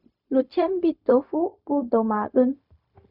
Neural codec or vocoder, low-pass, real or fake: codec, 16 kHz, 0.4 kbps, LongCat-Audio-Codec; 5.4 kHz; fake